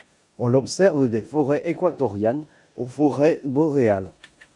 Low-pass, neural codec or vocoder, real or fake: 10.8 kHz; codec, 16 kHz in and 24 kHz out, 0.9 kbps, LongCat-Audio-Codec, four codebook decoder; fake